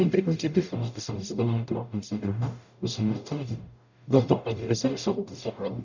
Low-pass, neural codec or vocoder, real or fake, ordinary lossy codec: 7.2 kHz; codec, 44.1 kHz, 0.9 kbps, DAC; fake; none